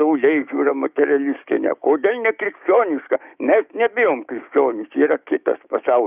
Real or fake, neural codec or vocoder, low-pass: fake; codec, 16 kHz, 6 kbps, DAC; 3.6 kHz